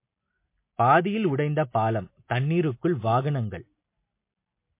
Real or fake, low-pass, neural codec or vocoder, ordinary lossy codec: real; 3.6 kHz; none; MP3, 24 kbps